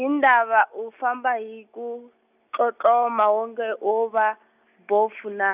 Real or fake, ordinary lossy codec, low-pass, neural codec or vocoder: real; none; 3.6 kHz; none